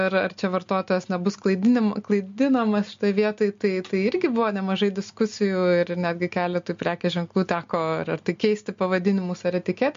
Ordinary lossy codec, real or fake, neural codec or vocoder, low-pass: MP3, 48 kbps; real; none; 7.2 kHz